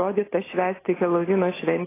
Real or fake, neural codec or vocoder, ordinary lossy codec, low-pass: real; none; AAC, 16 kbps; 3.6 kHz